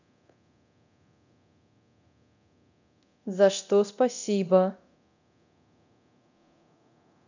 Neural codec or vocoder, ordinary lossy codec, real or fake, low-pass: codec, 24 kHz, 0.9 kbps, DualCodec; none; fake; 7.2 kHz